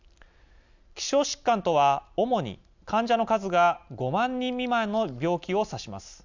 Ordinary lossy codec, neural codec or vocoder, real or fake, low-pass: none; none; real; 7.2 kHz